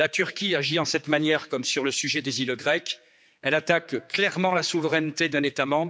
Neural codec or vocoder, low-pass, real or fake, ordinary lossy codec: codec, 16 kHz, 4 kbps, X-Codec, HuBERT features, trained on general audio; none; fake; none